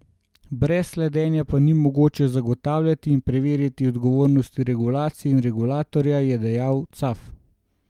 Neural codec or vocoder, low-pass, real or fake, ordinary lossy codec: none; 19.8 kHz; real; Opus, 32 kbps